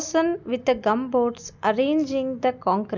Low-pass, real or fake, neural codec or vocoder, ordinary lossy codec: 7.2 kHz; real; none; none